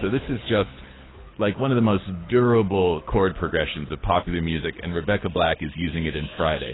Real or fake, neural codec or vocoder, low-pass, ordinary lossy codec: fake; codec, 24 kHz, 6 kbps, HILCodec; 7.2 kHz; AAC, 16 kbps